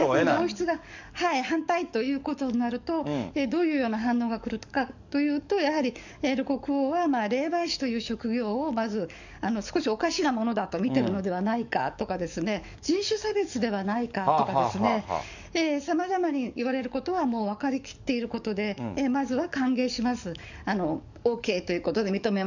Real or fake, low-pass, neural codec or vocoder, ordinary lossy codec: fake; 7.2 kHz; codec, 44.1 kHz, 7.8 kbps, DAC; none